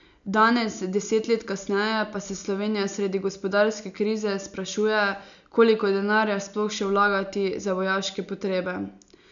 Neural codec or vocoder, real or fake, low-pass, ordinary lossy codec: none; real; 7.2 kHz; none